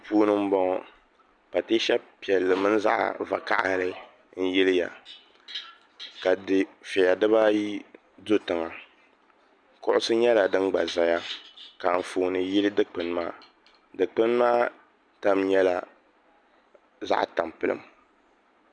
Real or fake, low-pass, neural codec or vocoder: real; 9.9 kHz; none